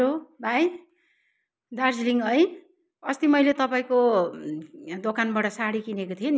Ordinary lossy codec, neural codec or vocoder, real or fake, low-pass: none; none; real; none